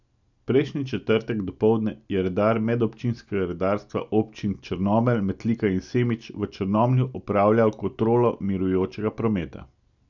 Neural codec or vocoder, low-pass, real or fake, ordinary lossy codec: none; 7.2 kHz; real; none